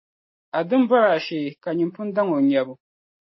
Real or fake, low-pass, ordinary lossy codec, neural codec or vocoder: real; 7.2 kHz; MP3, 24 kbps; none